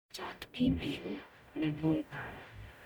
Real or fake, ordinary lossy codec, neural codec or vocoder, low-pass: fake; none; codec, 44.1 kHz, 0.9 kbps, DAC; 19.8 kHz